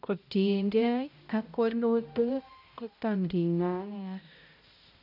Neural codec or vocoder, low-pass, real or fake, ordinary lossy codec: codec, 16 kHz, 0.5 kbps, X-Codec, HuBERT features, trained on balanced general audio; 5.4 kHz; fake; none